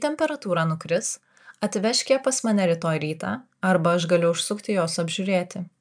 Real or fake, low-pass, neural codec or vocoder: real; 9.9 kHz; none